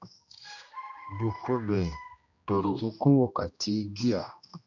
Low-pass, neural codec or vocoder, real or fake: 7.2 kHz; codec, 16 kHz, 1 kbps, X-Codec, HuBERT features, trained on general audio; fake